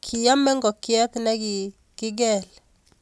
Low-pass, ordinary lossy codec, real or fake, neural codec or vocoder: none; none; real; none